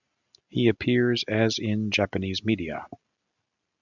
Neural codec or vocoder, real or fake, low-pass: none; real; 7.2 kHz